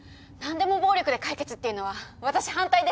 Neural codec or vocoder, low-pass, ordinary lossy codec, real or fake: none; none; none; real